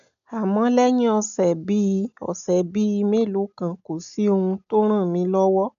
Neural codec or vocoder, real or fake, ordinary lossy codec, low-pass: none; real; MP3, 96 kbps; 7.2 kHz